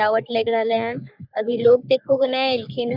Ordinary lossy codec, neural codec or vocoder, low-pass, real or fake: none; codec, 44.1 kHz, 3.4 kbps, Pupu-Codec; 5.4 kHz; fake